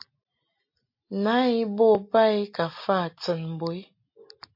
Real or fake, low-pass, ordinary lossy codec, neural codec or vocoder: real; 5.4 kHz; MP3, 32 kbps; none